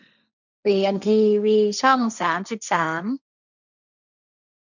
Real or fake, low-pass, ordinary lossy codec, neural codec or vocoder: fake; 7.2 kHz; none; codec, 16 kHz, 1.1 kbps, Voila-Tokenizer